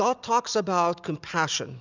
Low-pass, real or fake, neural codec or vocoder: 7.2 kHz; real; none